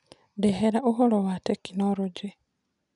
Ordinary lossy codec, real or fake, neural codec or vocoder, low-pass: none; real; none; 10.8 kHz